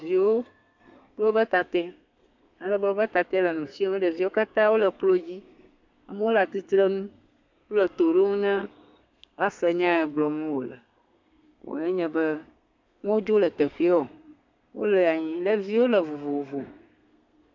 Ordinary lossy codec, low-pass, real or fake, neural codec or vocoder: MP3, 64 kbps; 7.2 kHz; fake; codec, 32 kHz, 1.9 kbps, SNAC